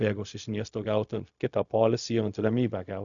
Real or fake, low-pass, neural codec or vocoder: fake; 7.2 kHz; codec, 16 kHz, 0.4 kbps, LongCat-Audio-Codec